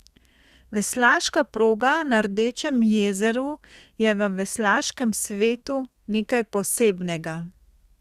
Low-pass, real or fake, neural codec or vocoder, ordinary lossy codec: 14.4 kHz; fake; codec, 32 kHz, 1.9 kbps, SNAC; Opus, 64 kbps